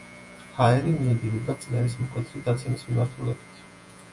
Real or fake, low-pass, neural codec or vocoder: fake; 10.8 kHz; vocoder, 48 kHz, 128 mel bands, Vocos